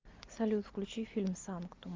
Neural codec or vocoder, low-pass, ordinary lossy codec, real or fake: none; 7.2 kHz; Opus, 32 kbps; real